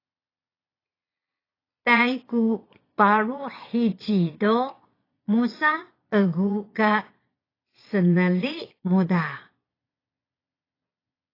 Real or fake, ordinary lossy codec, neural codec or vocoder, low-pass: fake; AAC, 24 kbps; vocoder, 22.05 kHz, 80 mel bands, Vocos; 5.4 kHz